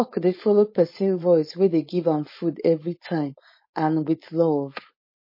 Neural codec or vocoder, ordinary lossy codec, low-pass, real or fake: codec, 16 kHz, 4.8 kbps, FACodec; MP3, 24 kbps; 5.4 kHz; fake